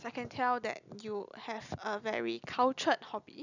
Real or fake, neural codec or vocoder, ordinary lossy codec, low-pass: real; none; none; 7.2 kHz